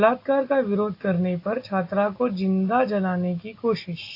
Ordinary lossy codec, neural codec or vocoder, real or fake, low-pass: AAC, 32 kbps; none; real; 5.4 kHz